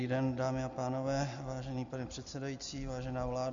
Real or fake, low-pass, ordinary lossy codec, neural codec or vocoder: real; 7.2 kHz; MP3, 64 kbps; none